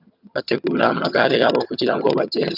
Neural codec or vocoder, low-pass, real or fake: vocoder, 22.05 kHz, 80 mel bands, HiFi-GAN; 5.4 kHz; fake